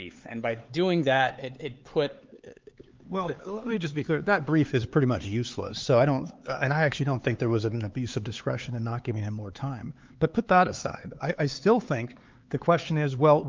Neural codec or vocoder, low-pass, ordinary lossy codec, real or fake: codec, 16 kHz, 4 kbps, X-Codec, HuBERT features, trained on LibriSpeech; 7.2 kHz; Opus, 32 kbps; fake